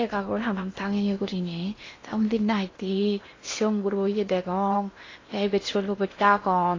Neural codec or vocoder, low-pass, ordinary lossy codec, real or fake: codec, 16 kHz in and 24 kHz out, 0.8 kbps, FocalCodec, streaming, 65536 codes; 7.2 kHz; AAC, 32 kbps; fake